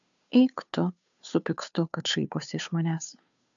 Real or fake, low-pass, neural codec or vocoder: fake; 7.2 kHz; codec, 16 kHz, 2 kbps, FunCodec, trained on Chinese and English, 25 frames a second